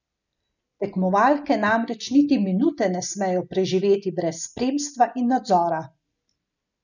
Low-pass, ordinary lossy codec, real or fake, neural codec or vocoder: 7.2 kHz; none; real; none